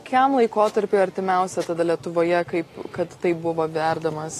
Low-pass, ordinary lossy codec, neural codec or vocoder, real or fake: 14.4 kHz; AAC, 48 kbps; none; real